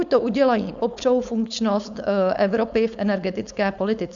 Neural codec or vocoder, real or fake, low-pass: codec, 16 kHz, 4.8 kbps, FACodec; fake; 7.2 kHz